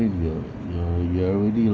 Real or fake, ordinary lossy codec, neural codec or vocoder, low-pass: real; none; none; none